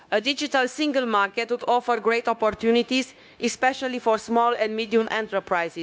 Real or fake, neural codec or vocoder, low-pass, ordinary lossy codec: fake; codec, 16 kHz, 0.9 kbps, LongCat-Audio-Codec; none; none